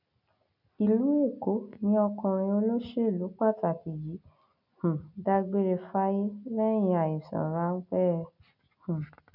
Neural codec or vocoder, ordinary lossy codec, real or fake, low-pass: none; none; real; 5.4 kHz